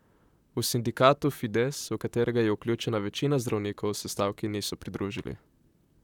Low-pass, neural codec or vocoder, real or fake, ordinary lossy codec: 19.8 kHz; vocoder, 44.1 kHz, 128 mel bands, Pupu-Vocoder; fake; none